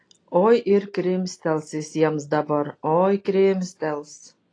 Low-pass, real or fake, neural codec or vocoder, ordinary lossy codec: 9.9 kHz; real; none; AAC, 32 kbps